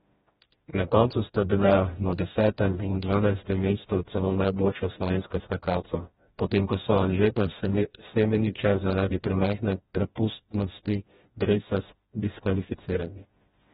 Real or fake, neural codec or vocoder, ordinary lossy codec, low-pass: fake; codec, 16 kHz, 1 kbps, FreqCodec, smaller model; AAC, 16 kbps; 7.2 kHz